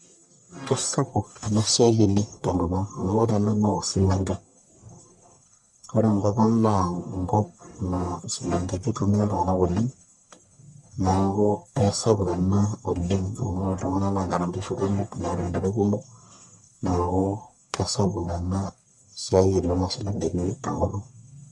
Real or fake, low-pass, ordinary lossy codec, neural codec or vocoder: fake; 10.8 kHz; MP3, 64 kbps; codec, 44.1 kHz, 1.7 kbps, Pupu-Codec